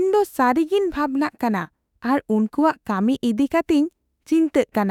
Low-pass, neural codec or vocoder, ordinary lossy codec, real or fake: 19.8 kHz; autoencoder, 48 kHz, 32 numbers a frame, DAC-VAE, trained on Japanese speech; none; fake